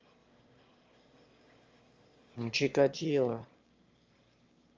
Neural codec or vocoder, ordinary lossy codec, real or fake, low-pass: autoencoder, 22.05 kHz, a latent of 192 numbers a frame, VITS, trained on one speaker; Opus, 32 kbps; fake; 7.2 kHz